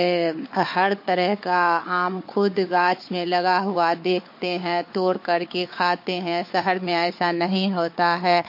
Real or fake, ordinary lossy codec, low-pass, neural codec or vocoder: fake; MP3, 32 kbps; 5.4 kHz; codec, 16 kHz, 4 kbps, FunCodec, trained on Chinese and English, 50 frames a second